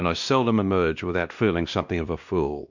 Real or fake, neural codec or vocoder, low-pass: fake; codec, 16 kHz, 1 kbps, X-Codec, WavLM features, trained on Multilingual LibriSpeech; 7.2 kHz